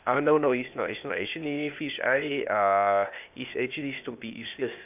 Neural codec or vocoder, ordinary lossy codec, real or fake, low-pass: codec, 16 kHz, 0.8 kbps, ZipCodec; none; fake; 3.6 kHz